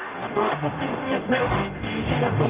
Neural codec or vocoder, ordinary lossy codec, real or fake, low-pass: codec, 44.1 kHz, 0.9 kbps, DAC; Opus, 24 kbps; fake; 3.6 kHz